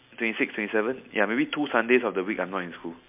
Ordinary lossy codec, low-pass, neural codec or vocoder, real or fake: MP3, 32 kbps; 3.6 kHz; none; real